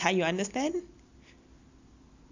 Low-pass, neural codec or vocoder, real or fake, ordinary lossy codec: 7.2 kHz; none; real; AAC, 48 kbps